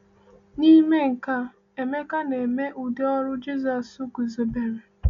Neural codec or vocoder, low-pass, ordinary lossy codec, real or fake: none; 7.2 kHz; MP3, 64 kbps; real